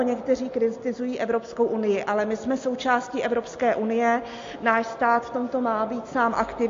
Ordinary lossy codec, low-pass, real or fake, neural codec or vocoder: AAC, 48 kbps; 7.2 kHz; real; none